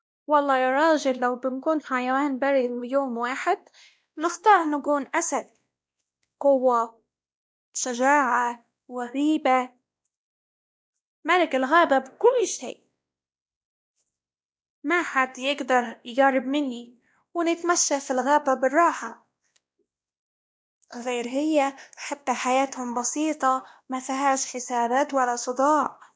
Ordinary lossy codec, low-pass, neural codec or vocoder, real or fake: none; none; codec, 16 kHz, 1 kbps, X-Codec, WavLM features, trained on Multilingual LibriSpeech; fake